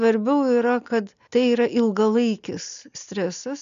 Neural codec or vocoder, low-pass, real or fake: none; 7.2 kHz; real